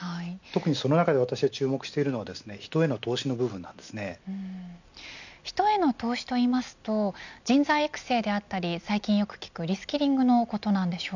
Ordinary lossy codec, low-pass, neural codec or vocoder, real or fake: none; 7.2 kHz; none; real